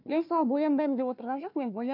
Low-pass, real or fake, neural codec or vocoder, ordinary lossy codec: 5.4 kHz; fake; codec, 16 kHz, 1 kbps, FunCodec, trained on Chinese and English, 50 frames a second; none